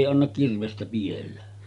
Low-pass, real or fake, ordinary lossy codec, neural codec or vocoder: 10.8 kHz; real; none; none